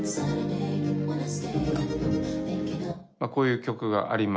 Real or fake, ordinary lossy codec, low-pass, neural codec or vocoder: real; none; none; none